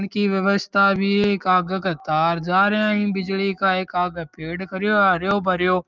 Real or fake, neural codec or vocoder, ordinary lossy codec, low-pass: real; none; Opus, 24 kbps; 7.2 kHz